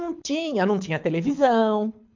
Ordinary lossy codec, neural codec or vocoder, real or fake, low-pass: MP3, 64 kbps; codec, 24 kHz, 6 kbps, HILCodec; fake; 7.2 kHz